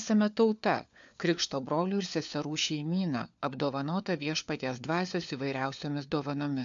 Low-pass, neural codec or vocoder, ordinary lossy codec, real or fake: 7.2 kHz; codec, 16 kHz, 4 kbps, FunCodec, trained on LibriTTS, 50 frames a second; AAC, 64 kbps; fake